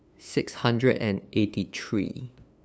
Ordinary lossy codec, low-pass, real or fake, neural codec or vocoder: none; none; fake; codec, 16 kHz, 2 kbps, FunCodec, trained on LibriTTS, 25 frames a second